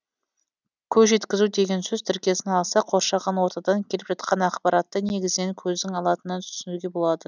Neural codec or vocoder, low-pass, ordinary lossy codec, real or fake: none; 7.2 kHz; none; real